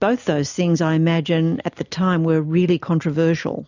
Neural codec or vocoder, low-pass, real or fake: none; 7.2 kHz; real